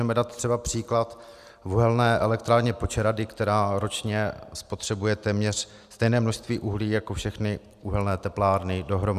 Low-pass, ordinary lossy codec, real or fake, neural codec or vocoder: 14.4 kHz; Opus, 64 kbps; real; none